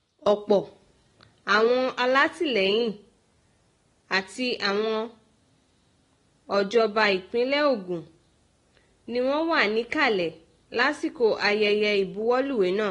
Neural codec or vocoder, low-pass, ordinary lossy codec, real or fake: none; 10.8 kHz; AAC, 32 kbps; real